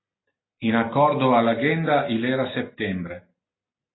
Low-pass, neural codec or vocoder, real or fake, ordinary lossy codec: 7.2 kHz; none; real; AAC, 16 kbps